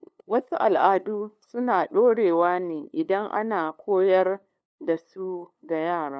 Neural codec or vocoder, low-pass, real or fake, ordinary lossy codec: codec, 16 kHz, 2 kbps, FunCodec, trained on LibriTTS, 25 frames a second; none; fake; none